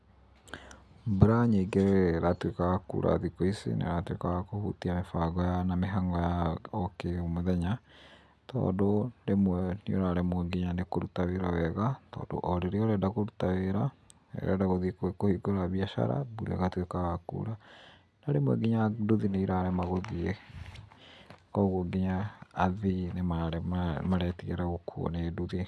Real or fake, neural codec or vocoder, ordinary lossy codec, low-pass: real; none; none; none